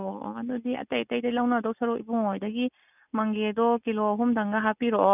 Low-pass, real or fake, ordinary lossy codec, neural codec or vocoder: 3.6 kHz; real; none; none